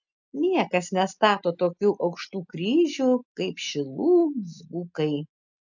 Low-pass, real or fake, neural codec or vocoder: 7.2 kHz; real; none